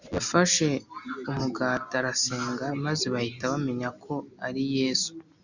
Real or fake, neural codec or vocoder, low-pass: real; none; 7.2 kHz